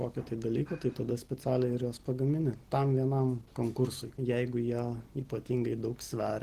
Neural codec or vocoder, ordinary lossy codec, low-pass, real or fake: none; Opus, 16 kbps; 14.4 kHz; real